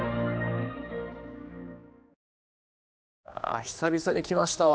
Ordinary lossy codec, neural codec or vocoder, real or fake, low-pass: none; codec, 16 kHz, 2 kbps, X-Codec, HuBERT features, trained on general audio; fake; none